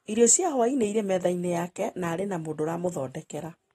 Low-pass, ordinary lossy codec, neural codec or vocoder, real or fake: 19.8 kHz; AAC, 32 kbps; none; real